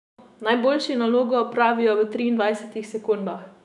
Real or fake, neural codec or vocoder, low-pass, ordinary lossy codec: fake; autoencoder, 48 kHz, 128 numbers a frame, DAC-VAE, trained on Japanese speech; 10.8 kHz; none